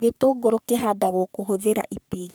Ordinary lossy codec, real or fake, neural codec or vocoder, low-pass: none; fake; codec, 44.1 kHz, 3.4 kbps, Pupu-Codec; none